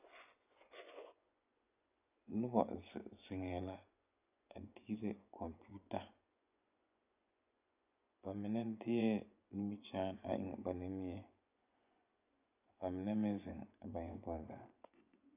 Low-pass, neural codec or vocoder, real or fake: 3.6 kHz; vocoder, 24 kHz, 100 mel bands, Vocos; fake